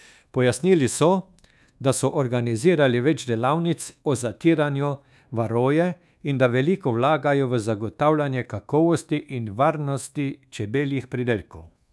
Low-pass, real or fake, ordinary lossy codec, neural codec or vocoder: none; fake; none; codec, 24 kHz, 1.2 kbps, DualCodec